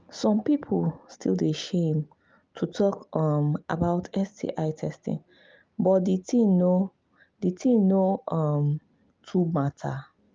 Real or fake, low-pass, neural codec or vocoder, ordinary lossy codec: real; 7.2 kHz; none; Opus, 24 kbps